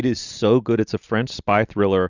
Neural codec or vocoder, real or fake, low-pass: none; real; 7.2 kHz